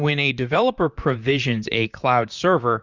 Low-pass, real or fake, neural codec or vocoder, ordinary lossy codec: 7.2 kHz; fake; vocoder, 44.1 kHz, 128 mel bands, Pupu-Vocoder; Opus, 64 kbps